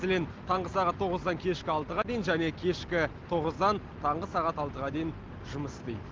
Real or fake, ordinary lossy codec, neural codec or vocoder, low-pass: real; Opus, 16 kbps; none; 7.2 kHz